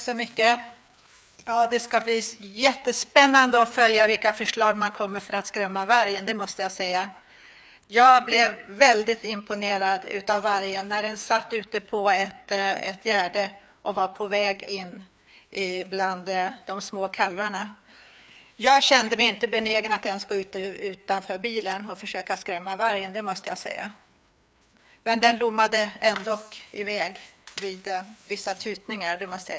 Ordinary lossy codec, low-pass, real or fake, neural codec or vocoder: none; none; fake; codec, 16 kHz, 2 kbps, FreqCodec, larger model